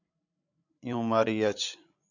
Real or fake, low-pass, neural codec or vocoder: fake; 7.2 kHz; codec, 16 kHz, 16 kbps, FreqCodec, larger model